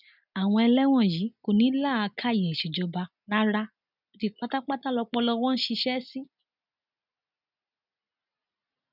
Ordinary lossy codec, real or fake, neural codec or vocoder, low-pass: none; real; none; 5.4 kHz